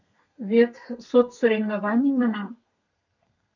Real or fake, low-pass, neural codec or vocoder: fake; 7.2 kHz; codec, 32 kHz, 1.9 kbps, SNAC